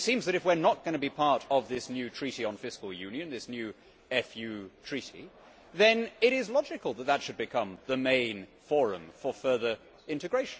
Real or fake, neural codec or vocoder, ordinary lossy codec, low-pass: real; none; none; none